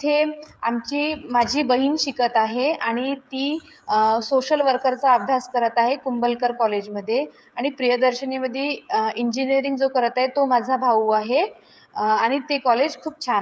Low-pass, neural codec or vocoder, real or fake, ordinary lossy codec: none; codec, 16 kHz, 16 kbps, FreqCodec, smaller model; fake; none